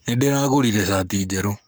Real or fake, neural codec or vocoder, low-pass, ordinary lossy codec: fake; codec, 44.1 kHz, 7.8 kbps, Pupu-Codec; none; none